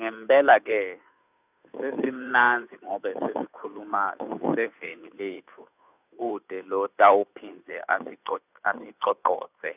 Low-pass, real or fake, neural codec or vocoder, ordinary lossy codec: 3.6 kHz; fake; codec, 16 kHz, 2 kbps, FunCodec, trained on Chinese and English, 25 frames a second; none